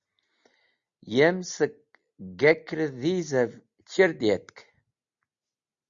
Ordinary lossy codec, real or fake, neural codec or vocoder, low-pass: Opus, 64 kbps; real; none; 7.2 kHz